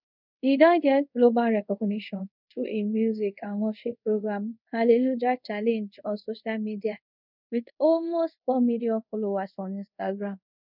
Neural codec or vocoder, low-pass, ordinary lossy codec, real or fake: codec, 24 kHz, 0.5 kbps, DualCodec; 5.4 kHz; none; fake